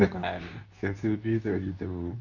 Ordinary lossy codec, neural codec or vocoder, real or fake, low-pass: none; codec, 24 kHz, 0.9 kbps, WavTokenizer, medium speech release version 2; fake; 7.2 kHz